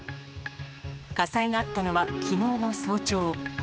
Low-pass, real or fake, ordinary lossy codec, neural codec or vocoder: none; fake; none; codec, 16 kHz, 2 kbps, X-Codec, HuBERT features, trained on general audio